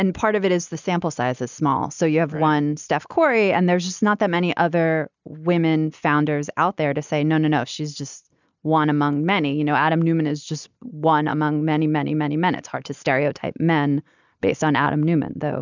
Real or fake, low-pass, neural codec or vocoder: real; 7.2 kHz; none